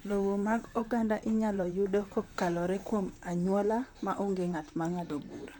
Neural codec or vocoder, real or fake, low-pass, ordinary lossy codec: vocoder, 44.1 kHz, 128 mel bands, Pupu-Vocoder; fake; 19.8 kHz; none